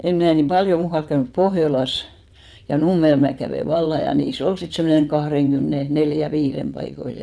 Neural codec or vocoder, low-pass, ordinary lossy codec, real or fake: vocoder, 22.05 kHz, 80 mel bands, WaveNeXt; none; none; fake